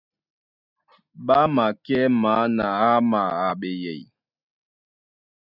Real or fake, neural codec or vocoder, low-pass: real; none; 5.4 kHz